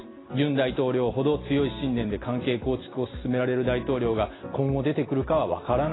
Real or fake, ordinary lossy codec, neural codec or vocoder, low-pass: real; AAC, 16 kbps; none; 7.2 kHz